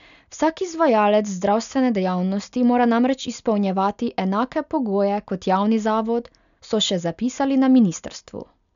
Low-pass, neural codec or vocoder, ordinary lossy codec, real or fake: 7.2 kHz; none; none; real